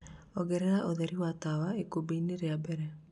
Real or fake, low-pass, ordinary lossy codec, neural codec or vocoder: real; 10.8 kHz; none; none